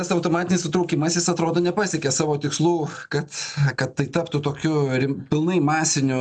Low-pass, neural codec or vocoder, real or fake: 9.9 kHz; none; real